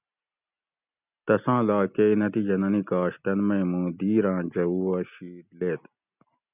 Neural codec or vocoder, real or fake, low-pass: none; real; 3.6 kHz